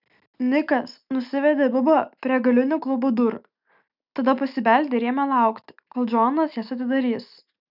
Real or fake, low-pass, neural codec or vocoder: real; 5.4 kHz; none